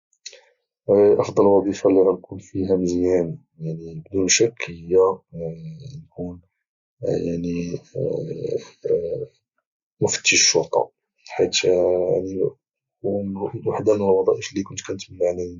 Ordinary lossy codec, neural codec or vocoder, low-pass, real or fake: Opus, 64 kbps; none; 7.2 kHz; real